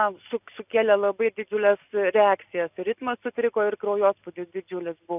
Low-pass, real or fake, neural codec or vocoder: 3.6 kHz; real; none